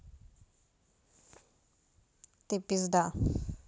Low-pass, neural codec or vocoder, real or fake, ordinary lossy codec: none; none; real; none